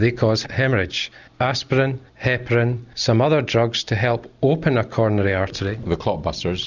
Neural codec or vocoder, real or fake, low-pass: none; real; 7.2 kHz